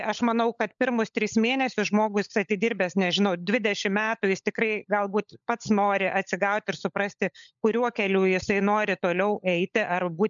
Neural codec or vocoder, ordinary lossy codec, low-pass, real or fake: codec, 16 kHz, 16 kbps, FunCodec, trained on LibriTTS, 50 frames a second; MP3, 96 kbps; 7.2 kHz; fake